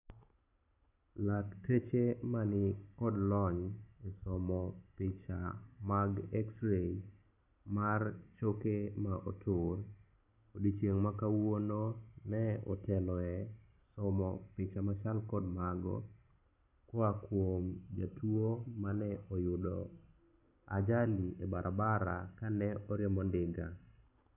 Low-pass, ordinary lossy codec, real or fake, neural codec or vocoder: 3.6 kHz; none; real; none